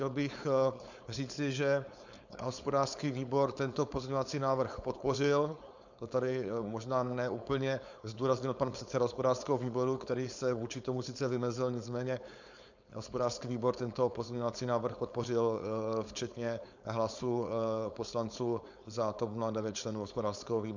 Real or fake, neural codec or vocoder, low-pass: fake; codec, 16 kHz, 4.8 kbps, FACodec; 7.2 kHz